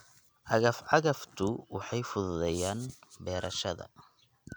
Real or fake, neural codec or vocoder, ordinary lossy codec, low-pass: real; none; none; none